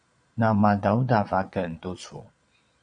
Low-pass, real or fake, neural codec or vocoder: 9.9 kHz; fake; vocoder, 22.05 kHz, 80 mel bands, Vocos